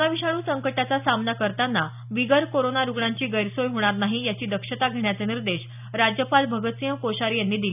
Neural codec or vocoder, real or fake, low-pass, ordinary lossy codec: none; real; 3.6 kHz; none